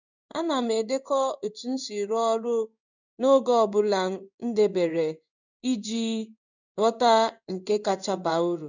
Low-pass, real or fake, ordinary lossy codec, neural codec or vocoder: 7.2 kHz; fake; none; codec, 16 kHz in and 24 kHz out, 1 kbps, XY-Tokenizer